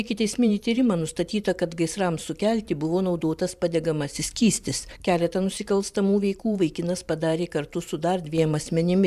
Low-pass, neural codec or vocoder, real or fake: 14.4 kHz; vocoder, 44.1 kHz, 128 mel bands every 512 samples, BigVGAN v2; fake